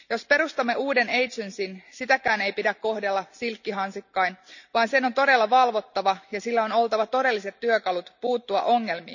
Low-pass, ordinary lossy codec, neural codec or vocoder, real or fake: 7.2 kHz; none; none; real